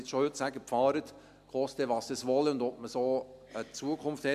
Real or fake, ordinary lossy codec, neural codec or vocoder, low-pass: real; MP3, 96 kbps; none; 14.4 kHz